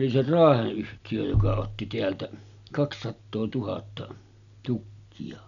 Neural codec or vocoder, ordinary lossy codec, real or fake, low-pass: none; none; real; 7.2 kHz